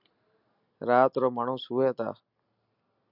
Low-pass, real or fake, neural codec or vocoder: 5.4 kHz; real; none